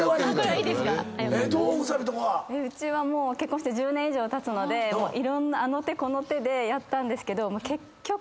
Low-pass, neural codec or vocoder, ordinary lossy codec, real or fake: none; none; none; real